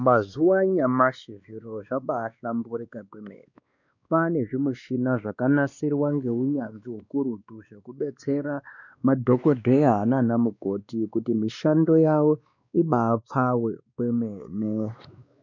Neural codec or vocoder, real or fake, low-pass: codec, 16 kHz, 2 kbps, X-Codec, WavLM features, trained on Multilingual LibriSpeech; fake; 7.2 kHz